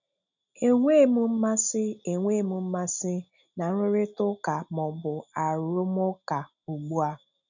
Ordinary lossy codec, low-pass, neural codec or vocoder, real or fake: none; 7.2 kHz; none; real